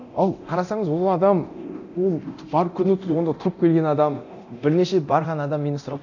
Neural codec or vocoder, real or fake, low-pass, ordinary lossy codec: codec, 24 kHz, 0.9 kbps, DualCodec; fake; 7.2 kHz; none